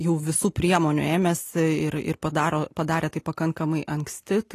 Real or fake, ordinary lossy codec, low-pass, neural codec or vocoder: real; AAC, 48 kbps; 14.4 kHz; none